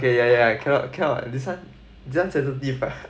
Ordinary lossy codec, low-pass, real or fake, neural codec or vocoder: none; none; real; none